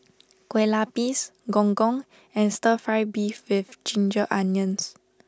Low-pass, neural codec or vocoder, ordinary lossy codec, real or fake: none; none; none; real